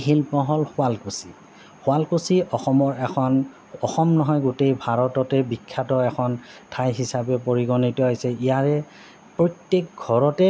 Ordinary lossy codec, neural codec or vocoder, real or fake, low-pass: none; none; real; none